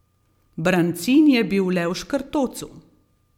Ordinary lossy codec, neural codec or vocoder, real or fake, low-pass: MP3, 96 kbps; vocoder, 44.1 kHz, 128 mel bands every 256 samples, BigVGAN v2; fake; 19.8 kHz